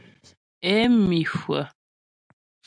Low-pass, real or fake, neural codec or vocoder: 9.9 kHz; real; none